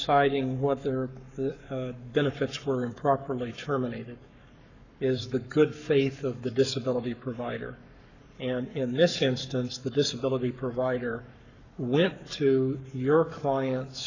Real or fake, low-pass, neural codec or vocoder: fake; 7.2 kHz; codec, 44.1 kHz, 7.8 kbps, Pupu-Codec